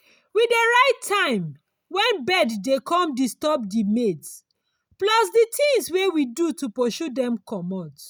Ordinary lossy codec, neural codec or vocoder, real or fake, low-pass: none; none; real; none